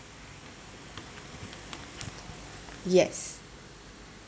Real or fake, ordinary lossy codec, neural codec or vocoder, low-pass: real; none; none; none